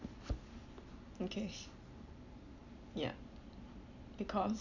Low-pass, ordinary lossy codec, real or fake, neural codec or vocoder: 7.2 kHz; none; real; none